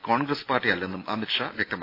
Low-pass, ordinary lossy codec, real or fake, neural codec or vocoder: 5.4 kHz; AAC, 32 kbps; real; none